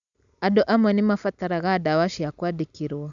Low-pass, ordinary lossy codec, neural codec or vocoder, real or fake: 7.2 kHz; none; none; real